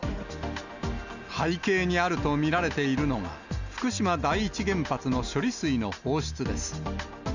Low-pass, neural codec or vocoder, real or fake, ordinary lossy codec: 7.2 kHz; none; real; none